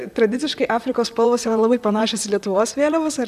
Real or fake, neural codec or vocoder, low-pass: fake; vocoder, 44.1 kHz, 128 mel bands, Pupu-Vocoder; 14.4 kHz